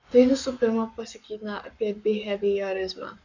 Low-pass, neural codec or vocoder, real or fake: 7.2 kHz; codec, 44.1 kHz, 7.8 kbps, Pupu-Codec; fake